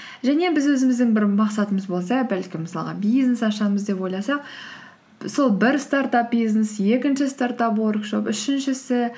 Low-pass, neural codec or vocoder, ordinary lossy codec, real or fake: none; none; none; real